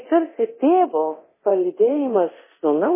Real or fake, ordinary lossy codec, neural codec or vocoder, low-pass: fake; MP3, 16 kbps; codec, 24 kHz, 0.9 kbps, DualCodec; 3.6 kHz